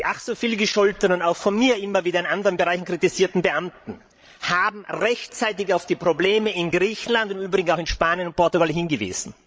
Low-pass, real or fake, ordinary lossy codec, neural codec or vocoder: none; fake; none; codec, 16 kHz, 16 kbps, FreqCodec, larger model